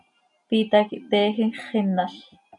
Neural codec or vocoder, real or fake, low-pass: none; real; 10.8 kHz